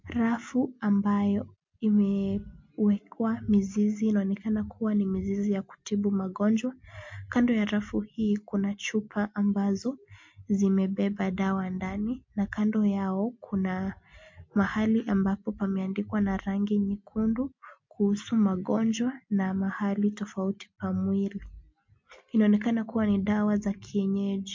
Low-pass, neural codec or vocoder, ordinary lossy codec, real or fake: 7.2 kHz; none; MP3, 48 kbps; real